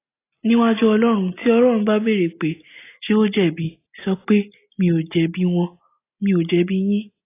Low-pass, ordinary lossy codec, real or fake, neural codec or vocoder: 3.6 kHz; AAC, 24 kbps; real; none